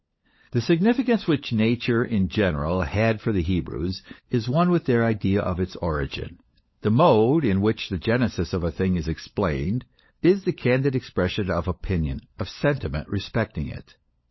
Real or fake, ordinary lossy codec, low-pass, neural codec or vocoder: fake; MP3, 24 kbps; 7.2 kHz; codec, 16 kHz, 16 kbps, FunCodec, trained on LibriTTS, 50 frames a second